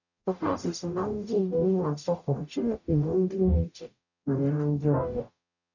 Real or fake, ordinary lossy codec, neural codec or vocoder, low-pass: fake; none; codec, 44.1 kHz, 0.9 kbps, DAC; 7.2 kHz